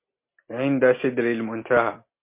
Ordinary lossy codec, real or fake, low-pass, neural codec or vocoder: MP3, 24 kbps; real; 3.6 kHz; none